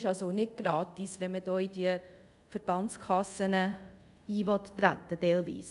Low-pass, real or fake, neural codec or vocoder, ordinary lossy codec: 10.8 kHz; fake; codec, 24 kHz, 0.5 kbps, DualCodec; none